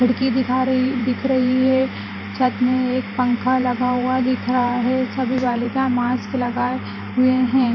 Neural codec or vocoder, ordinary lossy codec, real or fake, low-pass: vocoder, 44.1 kHz, 128 mel bands every 512 samples, BigVGAN v2; none; fake; 7.2 kHz